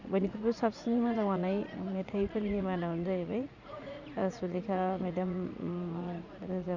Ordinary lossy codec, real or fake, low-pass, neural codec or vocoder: none; fake; 7.2 kHz; vocoder, 44.1 kHz, 80 mel bands, Vocos